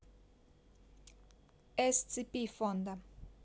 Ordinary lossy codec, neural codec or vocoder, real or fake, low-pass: none; none; real; none